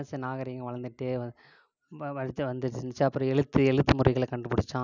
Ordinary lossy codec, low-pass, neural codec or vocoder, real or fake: none; 7.2 kHz; none; real